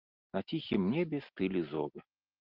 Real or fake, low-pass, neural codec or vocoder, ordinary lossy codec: real; 5.4 kHz; none; Opus, 32 kbps